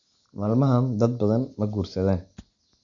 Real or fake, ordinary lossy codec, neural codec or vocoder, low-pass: real; none; none; 7.2 kHz